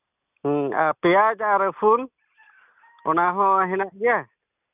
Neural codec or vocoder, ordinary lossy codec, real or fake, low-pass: none; none; real; 3.6 kHz